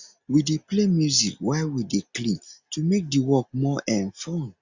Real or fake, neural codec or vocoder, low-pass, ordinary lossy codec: real; none; 7.2 kHz; Opus, 64 kbps